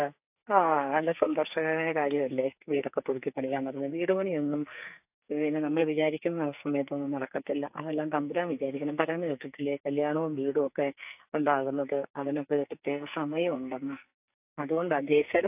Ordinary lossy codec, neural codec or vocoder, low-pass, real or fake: AAC, 32 kbps; codec, 44.1 kHz, 2.6 kbps, SNAC; 3.6 kHz; fake